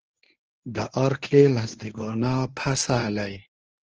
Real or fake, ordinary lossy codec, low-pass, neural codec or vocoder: fake; Opus, 16 kbps; 7.2 kHz; codec, 24 kHz, 0.9 kbps, WavTokenizer, medium speech release version 1